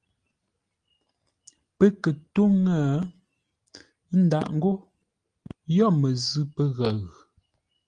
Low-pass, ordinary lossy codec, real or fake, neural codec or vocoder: 9.9 kHz; Opus, 32 kbps; real; none